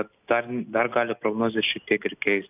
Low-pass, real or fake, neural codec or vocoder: 3.6 kHz; real; none